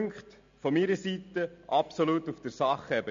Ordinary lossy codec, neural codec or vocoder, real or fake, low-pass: MP3, 48 kbps; none; real; 7.2 kHz